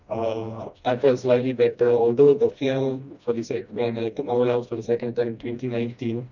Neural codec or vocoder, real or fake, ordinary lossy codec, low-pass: codec, 16 kHz, 1 kbps, FreqCodec, smaller model; fake; none; 7.2 kHz